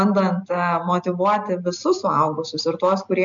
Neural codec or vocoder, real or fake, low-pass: none; real; 7.2 kHz